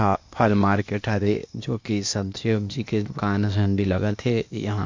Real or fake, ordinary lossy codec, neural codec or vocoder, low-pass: fake; MP3, 48 kbps; codec, 16 kHz, 0.8 kbps, ZipCodec; 7.2 kHz